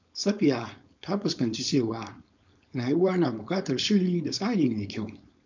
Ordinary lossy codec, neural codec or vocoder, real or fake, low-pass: MP3, 64 kbps; codec, 16 kHz, 4.8 kbps, FACodec; fake; 7.2 kHz